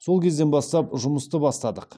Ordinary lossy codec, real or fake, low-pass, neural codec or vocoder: none; real; none; none